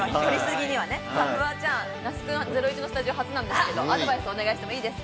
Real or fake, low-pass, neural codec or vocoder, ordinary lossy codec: real; none; none; none